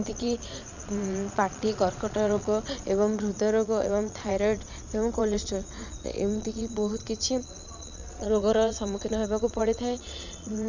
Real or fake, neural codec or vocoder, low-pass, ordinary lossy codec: fake; vocoder, 22.05 kHz, 80 mel bands, Vocos; 7.2 kHz; none